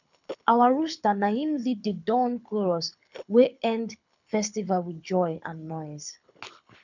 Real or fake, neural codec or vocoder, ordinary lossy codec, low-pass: fake; codec, 24 kHz, 6 kbps, HILCodec; none; 7.2 kHz